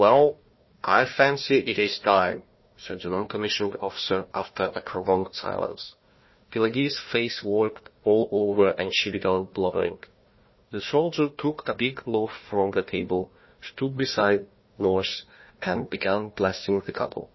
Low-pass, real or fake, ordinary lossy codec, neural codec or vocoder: 7.2 kHz; fake; MP3, 24 kbps; codec, 16 kHz, 1 kbps, FunCodec, trained on Chinese and English, 50 frames a second